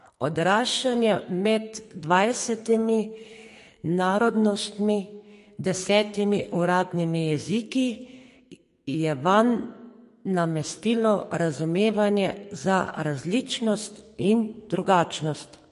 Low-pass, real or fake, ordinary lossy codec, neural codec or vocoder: 14.4 kHz; fake; MP3, 48 kbps; codec, 44.1 kHz, 2.6 kbps, SNAC